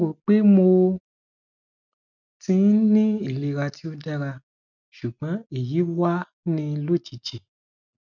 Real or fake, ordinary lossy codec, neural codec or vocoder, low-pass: real; none; none; 7.2 kHz